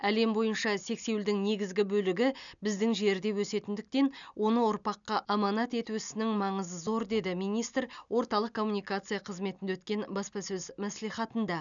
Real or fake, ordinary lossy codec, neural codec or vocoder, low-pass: real; none; none; 7.2 kHz